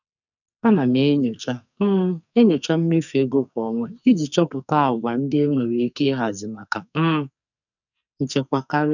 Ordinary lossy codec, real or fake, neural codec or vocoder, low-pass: none; fake; codec, 44.1 kHz, 2.6 kbps, SNAC; 7.2 kHz